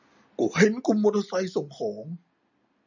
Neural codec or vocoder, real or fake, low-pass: none; real; 7.2 kHz